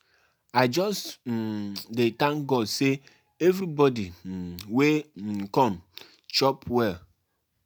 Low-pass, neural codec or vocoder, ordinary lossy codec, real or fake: none; none; none; real